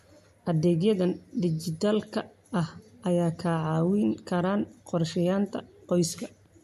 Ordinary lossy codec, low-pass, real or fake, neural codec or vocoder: MP3, 64 kbps; 14.4 kHz; real; none